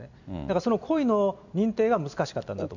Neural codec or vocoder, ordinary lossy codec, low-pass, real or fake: none; none; 7.2 kHz; real